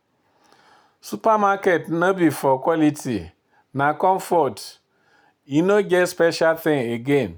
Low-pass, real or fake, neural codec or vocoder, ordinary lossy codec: none; real; none; none